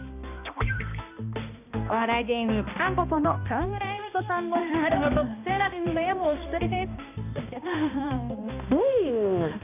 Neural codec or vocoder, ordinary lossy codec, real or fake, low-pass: codec, 16 kHz, 1 kbps, X-Codec, HuBERT features, trained on balanced general audio; none; fake; 3.6 kHz